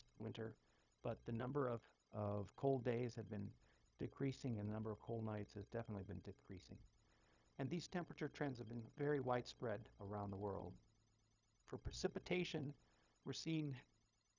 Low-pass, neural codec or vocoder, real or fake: 7.2 kHz; codec, 16 kHz, 0.4 kbps, LongCat-Audio-Codec; fake